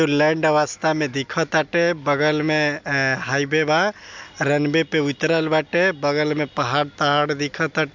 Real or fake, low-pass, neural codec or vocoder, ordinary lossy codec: real; 7.2 kHz; none; MP3, 64 kbps